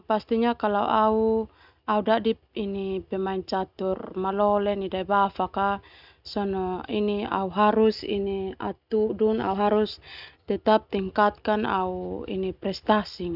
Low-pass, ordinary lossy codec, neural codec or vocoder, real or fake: 5.4 kHz; none; none; real